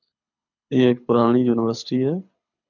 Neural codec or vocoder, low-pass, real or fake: codec, 24 kHz, 6 kbps, HILCodec; 7.2 kHz; fake